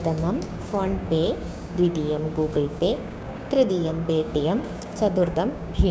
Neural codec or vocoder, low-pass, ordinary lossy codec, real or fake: codec, 16 kHz, 6 kbps, DAC; none; none; fake